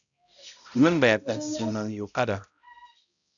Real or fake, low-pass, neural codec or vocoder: fake; 7.2 kHz; codec, 16 kHz, 0.5 kbps, X-Codec, HuBERT features, trained on balanced general audio